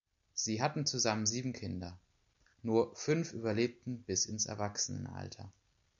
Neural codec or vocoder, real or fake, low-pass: none; real; 7.2 kHz